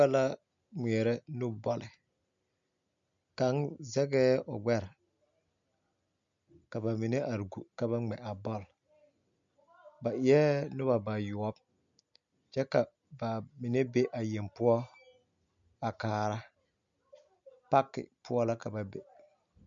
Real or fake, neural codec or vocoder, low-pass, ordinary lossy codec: real; none; 7.2 kHz; MP3, 64 kbps